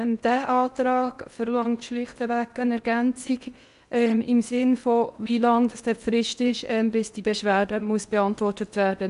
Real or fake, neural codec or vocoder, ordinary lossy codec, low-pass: fake; codec, 16 kHz in and 24 kHz out, 0.8 kbps, FocalCodec, streaming, 65536 codes; none; 10.8 kHz